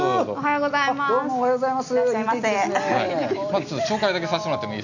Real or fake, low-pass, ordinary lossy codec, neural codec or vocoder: real; 7.2 kHz; none; none